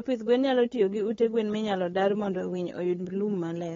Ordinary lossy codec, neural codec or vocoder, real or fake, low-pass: AAC, 24 kbps; codec, 16 kHz, 8 kbps, FunCodec, trained on LibriTTS, 25 frames a second; fake; 7.2 kHz